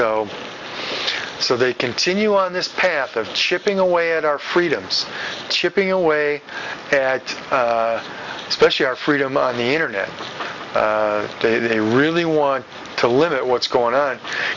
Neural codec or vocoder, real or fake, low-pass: none; real; 7.2 kHz